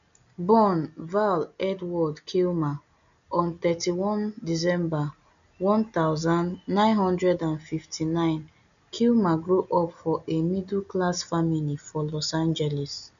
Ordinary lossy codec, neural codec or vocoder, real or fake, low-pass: none; none; real; 7.2 kHz